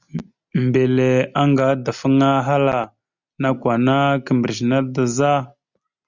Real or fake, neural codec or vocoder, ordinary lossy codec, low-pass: real; none; Opus, 64 kbps; 7.2 kHz